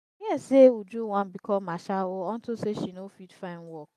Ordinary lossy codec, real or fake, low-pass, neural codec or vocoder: none; real; 14.4 kHz; none